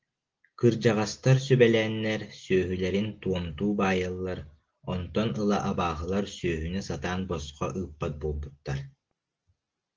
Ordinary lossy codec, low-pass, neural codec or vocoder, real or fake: Opus, 16 kbps; 7.2 kHz; none; real